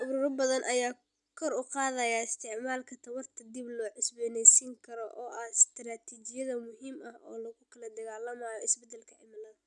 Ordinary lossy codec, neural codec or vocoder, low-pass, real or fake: none; none; 10.8 kHz; real